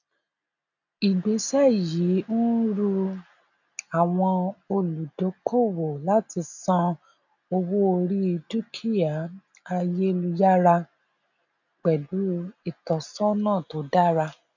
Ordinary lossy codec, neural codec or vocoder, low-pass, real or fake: none; none; 7.2 kHz; real